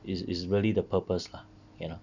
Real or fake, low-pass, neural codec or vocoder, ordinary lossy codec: real; 7.2 kHz; none; none